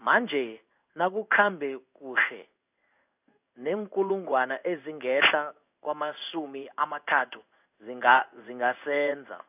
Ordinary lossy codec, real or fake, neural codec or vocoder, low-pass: none; fake; codec, 16 kHz in and 24 kHz out, 1 kbps, XY-Tokenizer; 3.6 kHz